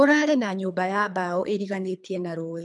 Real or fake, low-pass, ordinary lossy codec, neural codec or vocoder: fake; 10.8 kHz; none; codec, 24 kHz, 3 kbps, HILCodec